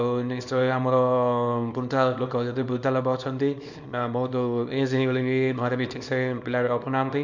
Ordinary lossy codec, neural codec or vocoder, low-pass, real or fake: none; codec, 24 kHz, 0.9 kbps, WavTokenizer, small release; 7.2 kHz; fake